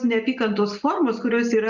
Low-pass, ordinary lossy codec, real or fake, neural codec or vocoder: 7.2 kHz; Opus, 64 kbps; real; none